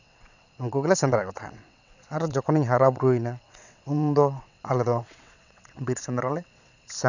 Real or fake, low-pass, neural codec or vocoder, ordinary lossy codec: fake; 7.2 kHz; vocoder, 22.05 kHz, 80 mel bands, WaveNeXt; none